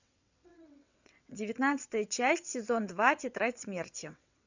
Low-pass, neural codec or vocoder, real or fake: 7.2 kHz; vocoder, 22.05 kHz, 80 mel bands, WaveNeXt; fake